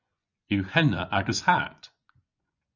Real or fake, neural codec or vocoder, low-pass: fake; vocoder, 24 kHz, 100 mel bands, Vocos; 7.2 kHz